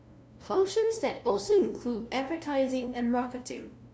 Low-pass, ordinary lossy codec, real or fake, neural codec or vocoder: none; none; fake; codec, 16 kHz, 0.5 kbps, FunCodec, trained on LibriTTS, 25 frames a second